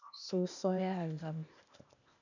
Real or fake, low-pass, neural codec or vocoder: fake; 7.2 kHz; codec, 16 kHz, 0.8 kbps, ZipCodec